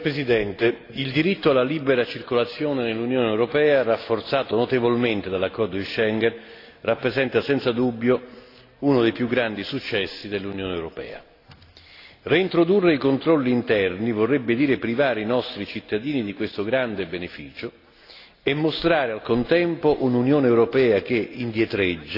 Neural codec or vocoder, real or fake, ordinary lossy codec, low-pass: none; real; AAC, 32 kbps; 5.4 kHz